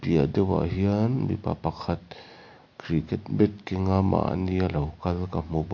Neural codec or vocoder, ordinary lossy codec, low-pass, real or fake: none; MP3, 48 kbps; 7.2 kHz; real